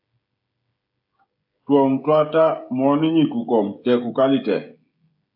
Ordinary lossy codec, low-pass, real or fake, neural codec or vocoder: AAC, 48 kbps; 5.4 kHz; fake; codec, 16 kHz, 16 kbps, FreqCodec, smaller model